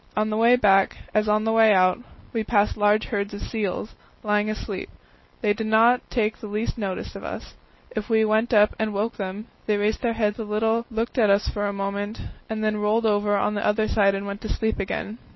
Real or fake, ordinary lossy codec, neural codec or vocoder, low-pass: real; MP3, 24 kbps; none; 7.2 kHz